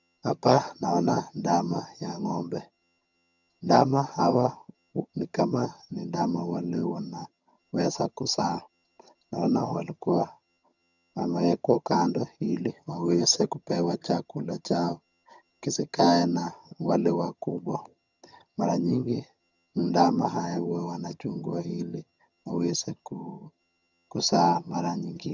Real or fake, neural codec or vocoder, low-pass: fake; vocoder, 22.05 kHz, 80 mel bands, HiFi-GAN; 7.2 kHz